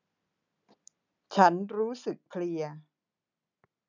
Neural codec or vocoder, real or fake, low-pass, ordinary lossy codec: none; real; 7.2 kHz; none